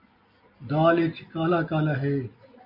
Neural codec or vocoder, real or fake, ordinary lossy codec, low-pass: none; real; MP3, 32 kbps; 5.4 kHz